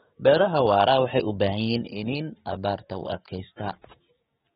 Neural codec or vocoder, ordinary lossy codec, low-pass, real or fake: codec, 16 kHz, 6 kbps, DAC; AAC, 16 kbps; 7.2 kHz; fake